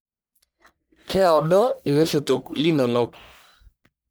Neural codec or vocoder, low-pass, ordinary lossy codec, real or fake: codec, 44.1 kHz, 1.7 kbps, Pupu-Codec; none; none; fake